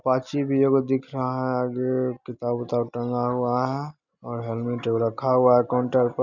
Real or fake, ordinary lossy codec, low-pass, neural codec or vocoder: real; none; 7.2 kHz; none